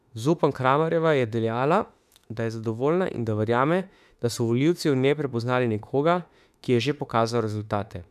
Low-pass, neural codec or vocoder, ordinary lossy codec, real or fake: 14.4 kHz; autoencoder, 48 kHz, 32 numbers a frame, DAC-VAE, trained on Japanese speech; none; fake